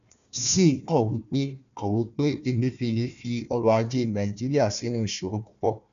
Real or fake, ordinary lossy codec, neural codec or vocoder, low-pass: fake; none; codec, 16 kHz, 1 kbps, FunCodec, trained on Chinese and English, 50 frames a second; 7.2 kHz